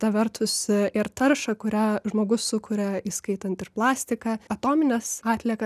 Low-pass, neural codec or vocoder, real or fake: 14.4 kHz; none; real